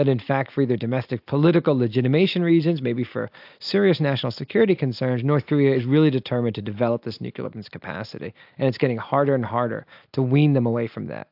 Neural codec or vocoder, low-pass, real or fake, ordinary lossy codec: none; 5.4 kHz; real; AAC, 48 kbps